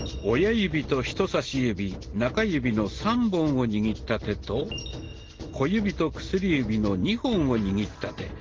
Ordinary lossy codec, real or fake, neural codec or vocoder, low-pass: Opus, 16 kbps; real; none; 7.2 kHz